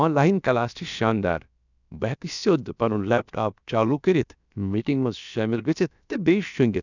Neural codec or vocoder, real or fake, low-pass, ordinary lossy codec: codec, 16 kHz, about 1 kbps, DyCAST, with the encoder's durations; fake; 7.2 kHz; none